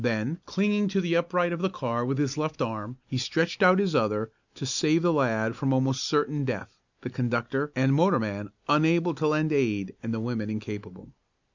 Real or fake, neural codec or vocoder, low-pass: real; none; 7.2 kHz